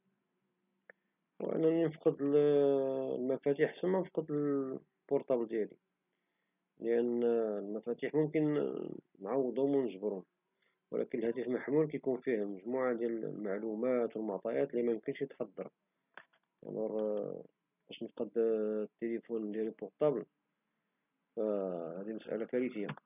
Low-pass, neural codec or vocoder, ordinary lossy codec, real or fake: 3.6 kHz; none; none; real